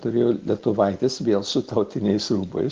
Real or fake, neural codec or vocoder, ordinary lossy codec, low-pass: real; none; Opus, 16 kbps; 7.2 kHz